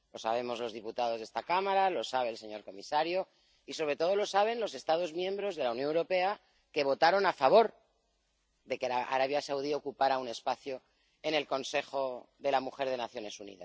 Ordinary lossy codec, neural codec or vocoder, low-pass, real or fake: none; none; none; real